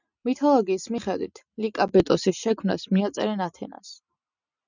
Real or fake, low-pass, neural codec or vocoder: real; 7.2 kHz; none